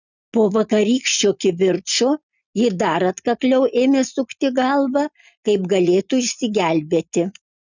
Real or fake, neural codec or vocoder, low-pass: real; none; 7.2 kHz